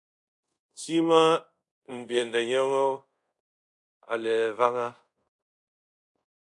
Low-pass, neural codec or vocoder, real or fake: 10.8 kHz; codec, 24 kHz, 0.5 kbps, DualCodec; fake